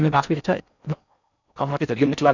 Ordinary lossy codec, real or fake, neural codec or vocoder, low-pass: none; fake; codec, 16 kHz in and 24 kHz out, 0.6 kbps, FocalCodec, streaming, 4096 codes; 7.2 kHz